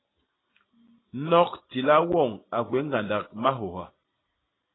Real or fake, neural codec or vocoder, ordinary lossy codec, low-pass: real; none; AAC, 16 kbps; 7.2 kHz